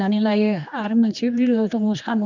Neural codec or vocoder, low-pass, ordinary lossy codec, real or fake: codec, 16 kHz, 2 kbps, X-Codec, HuBERT features, trained on general audio; 7.2 kHz; none; fake